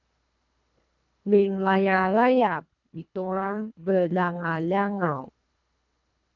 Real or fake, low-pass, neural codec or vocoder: fake; 7.2 kHz; codec, 24 kHz, 1.5 kbps, HILCodec